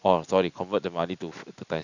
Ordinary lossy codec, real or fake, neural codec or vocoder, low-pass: none; real; none; 7.2 kHz